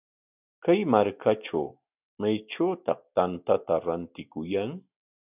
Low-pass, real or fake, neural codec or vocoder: 3.6 kHz; real; none